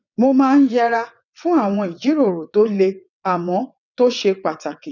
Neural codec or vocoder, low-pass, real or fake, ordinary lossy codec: vocoder, 22.05 kHz, 80 mel bands, WaveNeXt; 7.2 kHz; fake; none